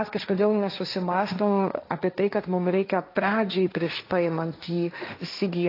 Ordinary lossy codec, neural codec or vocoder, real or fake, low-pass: AAC, 32 kbps; codec, 16 kHz, 1.1 kbps, Voila-Tokenizer; fake; 5.4 kHz